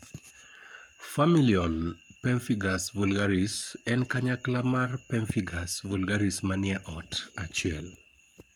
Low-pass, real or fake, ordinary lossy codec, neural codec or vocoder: 19.8 kHz; fake; none; codec, 44.1 kHz, 7.8 kbps, Pupu-Codec